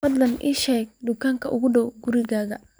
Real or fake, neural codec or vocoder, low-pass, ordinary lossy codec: fake; vocoder, 44.1 kHz, 128 mel bands every 512 samples, BigVGAN v2; none; none